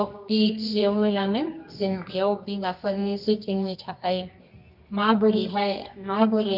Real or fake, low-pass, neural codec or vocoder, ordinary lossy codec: fake; 5.4 kHz; codec, 24 kHz, 0.9 kbps, WavTokenizer, medium music audio release; none